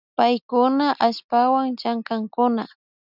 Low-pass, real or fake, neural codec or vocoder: 5.4 kHz; real; none